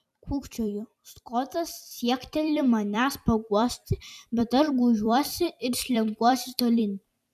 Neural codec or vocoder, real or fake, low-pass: vocoder, 44.1 kHz, 128 mel bands every 256 samples, BigVGAN v2; fake; 14.4 kHz